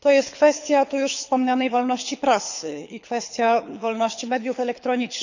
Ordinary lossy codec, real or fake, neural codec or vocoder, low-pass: none; fake; codec, 24 kHz, 6 kbps, HILCodec; 7.2 kHz